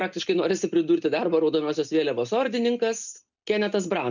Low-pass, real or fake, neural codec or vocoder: 7.2 kHz; real; none